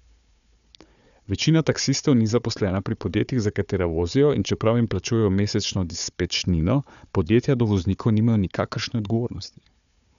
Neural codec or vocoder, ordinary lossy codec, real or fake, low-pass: codec, 16 kHz, 4 kbps, FunCodec, trained on Chinese and English, 50 frames a second; none; fake; 7.2 kHz